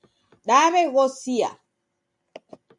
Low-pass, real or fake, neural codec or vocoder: 10.8 kHz; real; none